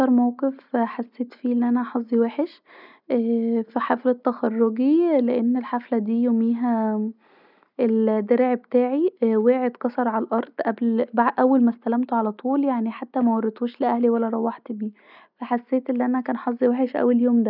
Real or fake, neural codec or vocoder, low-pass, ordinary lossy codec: real; none; 5.4 kHz; none